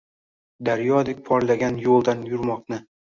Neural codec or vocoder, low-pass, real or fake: none; 7.2 kHz; real